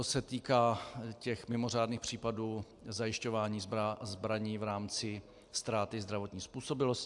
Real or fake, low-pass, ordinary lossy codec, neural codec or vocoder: real; 10.8 kHz; AAC, 64 kbps; none